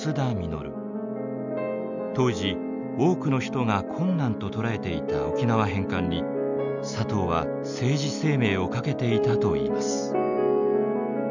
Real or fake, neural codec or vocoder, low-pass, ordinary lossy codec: real; none; 7.2 kHz; none